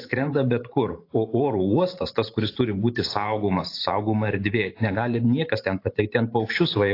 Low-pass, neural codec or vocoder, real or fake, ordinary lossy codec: 5.4 kHz; vocoder, 44.1 kHz, 128 mel bands every 512 samples, BigVGAN v2; fake; AAC, 32 kbps